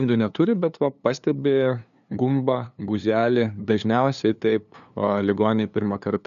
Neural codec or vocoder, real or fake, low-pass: codec, 16 kHz, 2 kbps, FunCodec, trained on LibriTTS, 25 frames a second; fake; 7.2 kHz